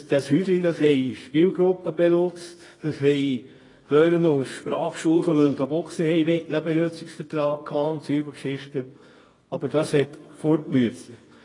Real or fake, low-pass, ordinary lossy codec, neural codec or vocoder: fake; 10.8 kHz; AAC, 32 kbps; codec, 24 kHz, 0.9 kbps, WavTokenizer, medium music audio release